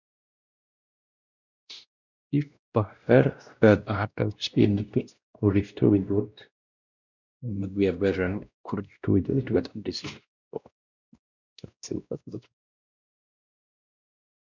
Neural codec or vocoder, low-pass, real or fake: codec, 16 kHz, 1 kbps, X-Codec, WavLM features, trained on Multilingual LibriSpeech; 7.2 kHz; fake